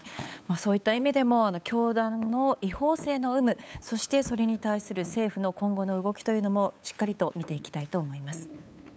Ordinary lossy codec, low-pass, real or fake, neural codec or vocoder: none; none; fake; codec, 16 kHz, 8 kbps, FunCodec, trained on LibriTTS, 25 frames a second